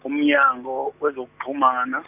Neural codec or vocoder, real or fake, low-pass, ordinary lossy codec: none; real; 3.6 kHz; none